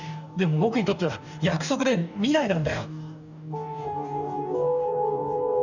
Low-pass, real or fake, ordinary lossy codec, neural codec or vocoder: 7.2 kHz; fake; none; codec, 44.1 kHz, 2.6 kbps, DAC